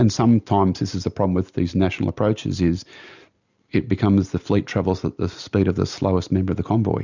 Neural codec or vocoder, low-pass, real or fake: none; 7.2 kHz; real